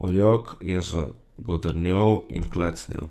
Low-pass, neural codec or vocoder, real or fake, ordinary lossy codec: 14.4 kHz; codec, 44.1 kHz, 2.6 kbps, SNAC; fake; none